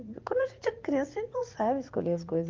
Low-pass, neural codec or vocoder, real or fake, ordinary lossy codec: 7.2 kHz; vocoder, 44.1 kHz, 80 mel bands, Vocos; fake; Opus, 32 kbps